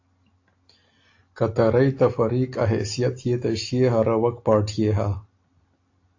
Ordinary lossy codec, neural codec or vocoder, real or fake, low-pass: AAC, 48 kbps; none; real; 7.2 kHz